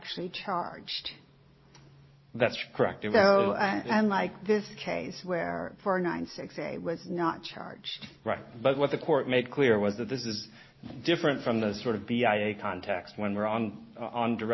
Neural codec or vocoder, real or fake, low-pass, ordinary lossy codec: none; real; 7.2 kHz; MP3, 24 kbps